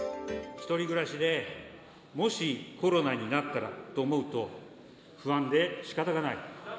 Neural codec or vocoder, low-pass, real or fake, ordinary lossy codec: none; none; real; none